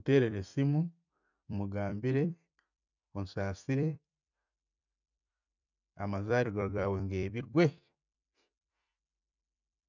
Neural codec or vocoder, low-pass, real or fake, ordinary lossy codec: vocoder, 44.1 kHz, 128 mel bands every 256 samples, BigVGAN v2; 7.2 kHz; fake; none